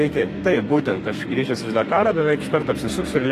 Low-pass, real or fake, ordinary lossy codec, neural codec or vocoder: 14.4 kHz; fake; AAC, 48 kbps; codec, 32 kHz, 1.9 kbps, SNAC